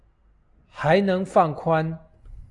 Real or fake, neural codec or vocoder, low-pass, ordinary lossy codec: real; none; 10.8 kHz; AAC, 48 kbps